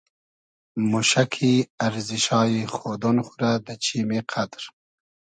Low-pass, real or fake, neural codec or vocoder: 9.9 kHz; real; none